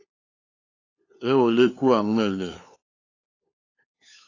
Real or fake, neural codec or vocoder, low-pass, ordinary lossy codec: fake; codec, 16 kHz, 2 kbps, FunCodec, trained on LibriTTS, 25 frames a second; 7.2 kHz; AAC, 48 kbps